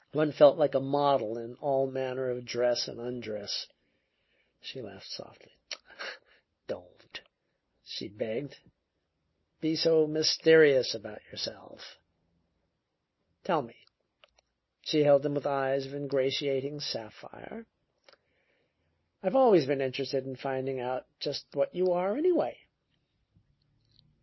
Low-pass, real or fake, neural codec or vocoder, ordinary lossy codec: 7.2 kHz; real; none; MP3, 24 kbps